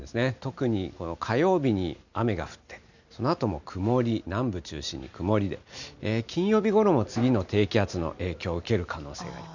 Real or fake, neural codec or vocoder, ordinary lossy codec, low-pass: real; none; none; 7.2 kHz